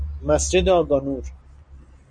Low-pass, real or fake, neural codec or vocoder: 9.9 kHz; real; none